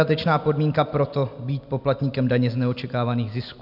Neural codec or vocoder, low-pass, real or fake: none; 5.4 kHz; real